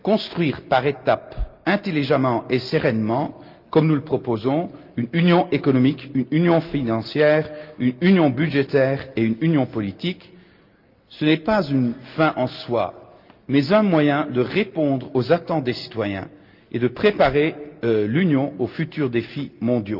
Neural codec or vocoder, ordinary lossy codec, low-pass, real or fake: none; Opus, 24 kbps; 5.4 kHz; real